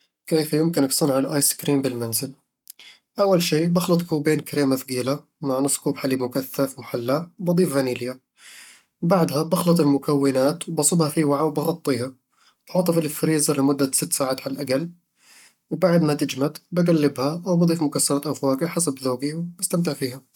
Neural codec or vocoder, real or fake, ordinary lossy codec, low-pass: codec, 44.1 kHz, 7.8 kbps, Pupu-Codec; fake; none; 19.8 kHz